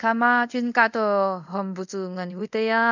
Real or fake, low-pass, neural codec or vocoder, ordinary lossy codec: fake; 7.2 kHz; codec, 24 kHz, 0.5 kbps, DualCodec; none